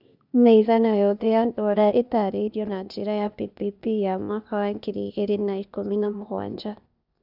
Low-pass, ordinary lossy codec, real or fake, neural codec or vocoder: 5.4 kHz; none; fake; codec, 16 kHz, 0.8 kbps, ZipCodec